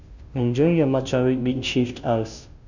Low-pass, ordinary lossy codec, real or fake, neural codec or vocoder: 7.2 kHz; none; fake; codec, 16 kHz, 0.5 kbps, FunCodec, trained on Chinese and English, 25 frames a second